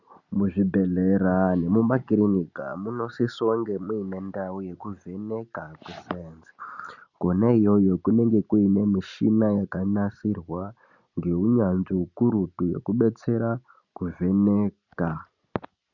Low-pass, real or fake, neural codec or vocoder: 7.2 kHz; real; none